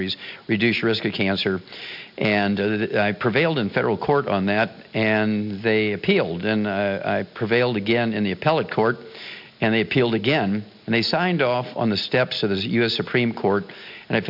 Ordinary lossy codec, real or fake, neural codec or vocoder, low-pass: MP3, 48 kbps; real; none; 5.4 kHz